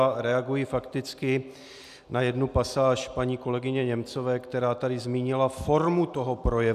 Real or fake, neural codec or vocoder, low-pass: real; none; 14.4 kHz